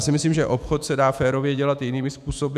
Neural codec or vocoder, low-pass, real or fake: autoencoder, 48 kHz, 128 numbers a frame, DAC-VAE, trained on Japanese speech; 14.4 kHz; fake